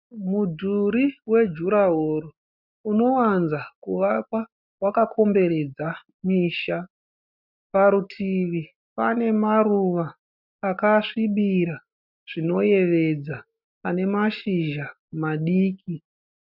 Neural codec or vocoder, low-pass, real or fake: none; 5.4 kHz; real